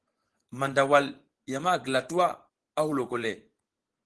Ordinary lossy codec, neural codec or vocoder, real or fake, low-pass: Opus, 16 kbps; vocoder, 24 kHz, 100 mel bands, Vocos; fake; 10.8 kHz